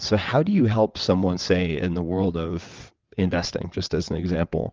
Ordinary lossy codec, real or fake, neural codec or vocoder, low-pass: Opus, 24 kbps; fake; vocoder, 22.05 kHz, 80 mel bands, WaveNeXt; 7.2 kHz